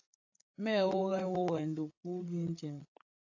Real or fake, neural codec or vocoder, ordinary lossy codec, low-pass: fake; codec, 16 kHz, 8 kbps, FreqCodec, larger model; AAC, 48 kbps; 7.2 kHz